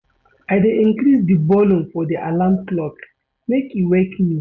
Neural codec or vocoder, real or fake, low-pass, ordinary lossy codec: none; real; 7.2 kHz; none